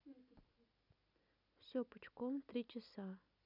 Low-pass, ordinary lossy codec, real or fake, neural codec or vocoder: 5.4 kHz; none; real; none